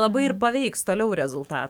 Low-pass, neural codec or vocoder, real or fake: 19.8 kHz; codec, 44.1 kHz, 7.8 kbps, DAC; fake